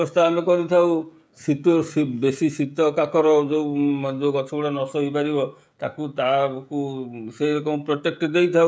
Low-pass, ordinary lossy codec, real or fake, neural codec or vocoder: none; none; fake; codec, 16 kHz, 16 kbps, FreqCodec, smaller model